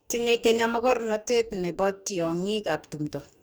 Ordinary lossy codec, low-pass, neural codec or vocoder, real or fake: none; none; codec, 44.1 kHz, 2.6 kbps, DAC; fake